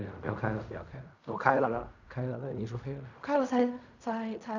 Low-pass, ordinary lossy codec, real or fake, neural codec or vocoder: 7.2 kHz; none; fake; codec, 16 kHz in and 24 kHz out, 0.4 kbps, LongCat-Audio-Codec, fine tuned four codebook decoder